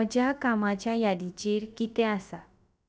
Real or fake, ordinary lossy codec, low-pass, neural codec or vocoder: fake; none; none; codec, 16 kHz, about 1 kbps, DyCAST, with the encoder's durations